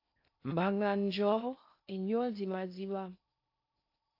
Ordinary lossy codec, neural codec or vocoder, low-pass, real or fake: AAC, 32 kbps; codec, 16 kHz in and 24 kHz out, 0.6 kbps, FocalCodec, streaming, 2048 codes; 5.4 kHz; fake